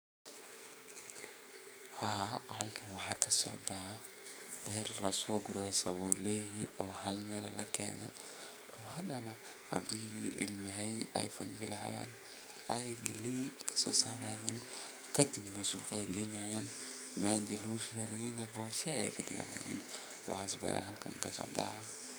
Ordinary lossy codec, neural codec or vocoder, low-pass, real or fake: none; codec, 44.1 kHz, 2.6 kbps, SNAC; none; fake